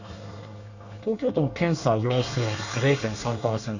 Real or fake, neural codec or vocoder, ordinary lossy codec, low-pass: fake; codec, 24 kHz, 1 kbps, SNAC; none; 7.2 kHz